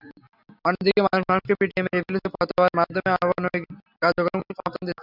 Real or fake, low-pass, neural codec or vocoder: real; 5.4 kHz; none